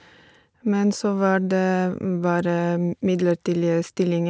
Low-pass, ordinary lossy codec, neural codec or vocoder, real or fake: none; none; none; real